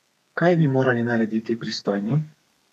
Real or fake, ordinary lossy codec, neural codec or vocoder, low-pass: fake; none; codec, 32 kHz, 1.9 kbps, SNAC; 14.4 kHz